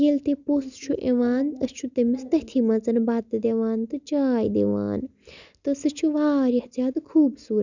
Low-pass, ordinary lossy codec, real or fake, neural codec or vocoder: 7.2 kHz; none; real; none